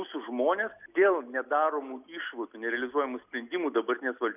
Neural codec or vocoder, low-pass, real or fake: none; 3.6 kHz; real